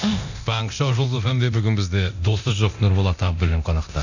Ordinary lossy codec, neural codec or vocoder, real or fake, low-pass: none; codec, 24 kHz, 0.9 kbps, DualCodec; fake; 7.2 kHz